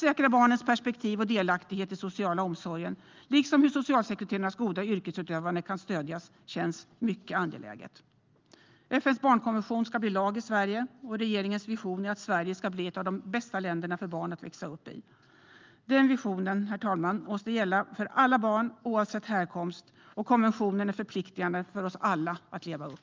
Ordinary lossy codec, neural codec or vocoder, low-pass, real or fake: Opus, 32 kbps; none; 7.2 kHz; real